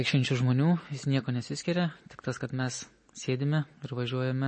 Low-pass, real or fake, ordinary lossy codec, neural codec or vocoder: 10.8 kHz; real; MP3, 32 kbps; none